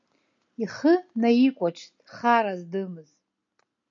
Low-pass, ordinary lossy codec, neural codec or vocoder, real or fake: 7.2 kHz; MP3, 64 kbps; none; real